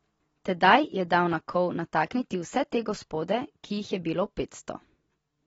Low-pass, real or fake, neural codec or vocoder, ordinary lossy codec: 9.9 kHz; real; none; AAC, 24 kbps